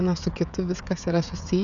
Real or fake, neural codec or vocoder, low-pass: fake; codec, 16 kHz, 16 kbps, FreqCodec, smaller model; 7.2 kHz